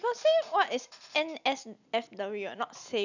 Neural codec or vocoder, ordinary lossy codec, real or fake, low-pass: none; none; real; 7.2 kHz